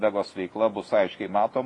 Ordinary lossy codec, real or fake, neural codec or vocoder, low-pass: AAC, 32 kbps; real; none; 10.8 kHz